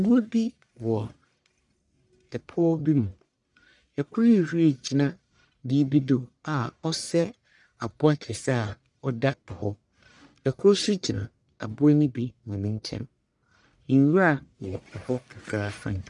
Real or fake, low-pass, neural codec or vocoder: fake; 10.8 kHz; codec, 44.1 kHz, 1.7 kbps, Pupu-Codec